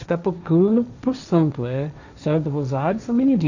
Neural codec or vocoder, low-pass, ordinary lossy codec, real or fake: codec, 16 kHz, 1.1 kbps, Voila-Tokenizer; 7.2 kHz; none; fake